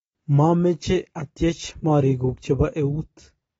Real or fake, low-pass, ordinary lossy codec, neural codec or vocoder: real; 19.8 kHz; AAC, 24 kbps; none